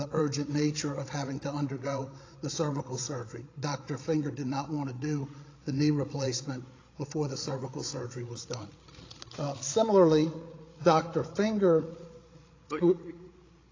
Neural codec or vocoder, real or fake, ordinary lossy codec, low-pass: codec, 16 kHz, 16 kbps, FreqCodec, larger model; fake; AAC, 32 kbps; 7.2 kHz